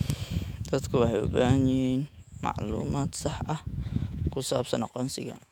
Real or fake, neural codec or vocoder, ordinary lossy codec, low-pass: fake; autoencoder, 48 kHz, 128 numbers a frame, DAC-VAE, trained on Japanese speech; MP3, 96 kbps; 19.8 kHz